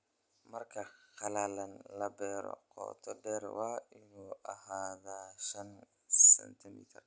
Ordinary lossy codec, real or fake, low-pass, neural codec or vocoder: none; real; none; none